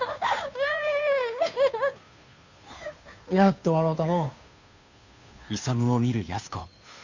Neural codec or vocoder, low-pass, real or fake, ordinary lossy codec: codec, 16 kHz, 2 kbps, FunCodec, trained on Chinese and English, 25 frames a second; 7.2 kHz; fake; none